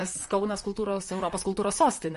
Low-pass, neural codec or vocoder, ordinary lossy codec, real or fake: 14.4 kHz; vocoder, 44.1 kHz, 128 mel bands, Pupu-Vocoder; MP3, 48 kbps; fake